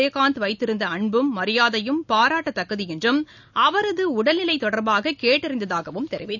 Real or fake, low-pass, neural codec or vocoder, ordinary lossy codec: real; 7.2 kHz; none; none